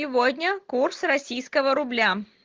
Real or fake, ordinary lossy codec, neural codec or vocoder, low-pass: real; Opus, 16 kbps; none; 7.2 kHz